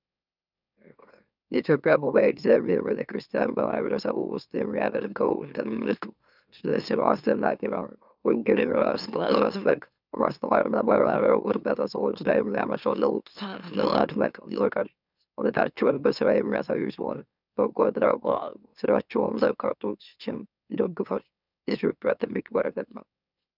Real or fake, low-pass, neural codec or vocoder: fake; 5.4 kHz; autoencoder, 44.1 kHz, a latent of 192 numbers a frame, MeloTTS